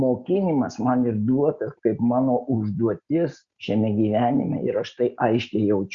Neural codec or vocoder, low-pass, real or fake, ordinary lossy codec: none; 7.2 kHz; real; Opus, 64 kbps